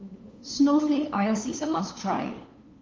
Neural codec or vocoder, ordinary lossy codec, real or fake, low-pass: codec, 16 kHz, 2 kbps, FunCodec, trained on LibriTTS, 25 frames a second; Opus, 32 kbps; fake; 7.2 kHz